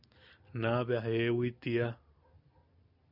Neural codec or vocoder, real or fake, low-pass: none; real; 5.4 kHz